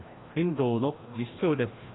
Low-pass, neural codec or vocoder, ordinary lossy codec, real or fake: 7.2 kHz; codec, 16 kHz, 1 kbps, FreqCodec, larger model; AAC, 16 kbps; fake